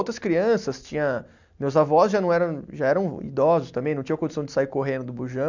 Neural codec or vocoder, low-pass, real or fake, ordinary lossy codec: none; 7.2 kHz; real; none